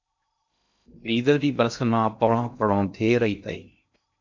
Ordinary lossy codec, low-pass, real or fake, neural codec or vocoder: MP3, 64 kbps; 7.2 kHz; fake; codec, 16 kHz in and 24 kHz out, 0.6 kbps, FocalCodec, streaming, 2048 codes